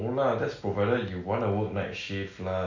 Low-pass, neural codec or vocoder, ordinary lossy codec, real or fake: 7.2 kHz; none; none; real